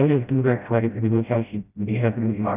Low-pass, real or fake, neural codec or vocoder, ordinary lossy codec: 3.6 kHz; fake; codec, 16 kHz, 0.5 kbps, FreqCodec, smaller model; none